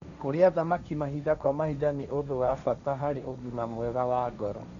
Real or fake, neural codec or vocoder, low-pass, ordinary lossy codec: fake; codec, 16 kHz, 1.1 kbps, Voila-Tokenizer; 7.2 kHz; none